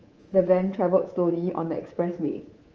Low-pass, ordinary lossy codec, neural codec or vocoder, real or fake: 7.2 kHz; Opus, 24 kbps; codec, 24 kHz, 3.1 kbps, DualCodec; fake